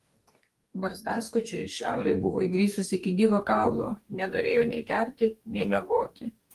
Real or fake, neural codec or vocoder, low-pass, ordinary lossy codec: fake; codec, 44.1 kHz, 2.6 kbps, DAC; 14.4 kHz; Opus, 32 kbps